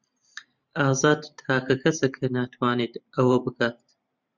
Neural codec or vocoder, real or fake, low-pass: vocoder, 44.1 kHz, 128 mel bands every 512 samples, BigVGAN v2; fake; 7.2 kHz